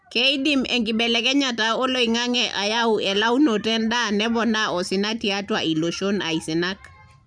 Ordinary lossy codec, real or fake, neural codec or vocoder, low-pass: none; real; none; 9.9 kHz